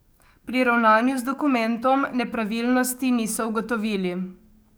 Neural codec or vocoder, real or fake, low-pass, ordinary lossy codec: codec, 44.1 kHz, 7.8 kbps, DAC; fake; none; none